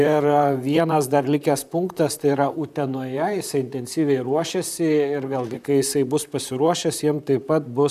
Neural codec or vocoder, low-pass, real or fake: vocoder, 44.1 kHz, 128 mel bands, Pupu-Vocoder; 14.4 kHz; fake